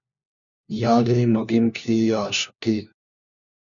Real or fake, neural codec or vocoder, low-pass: fake; codec, 16 kHz, 1 kbps, FunCodec, trained on LibriTTS, 50 frames a second; 7.2 kHz